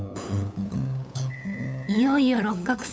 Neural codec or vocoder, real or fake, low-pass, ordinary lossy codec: codec, 16 kHz, 8 kbps, FunCodec, trained on LibriTTS, 25 frames a second; fake; none; none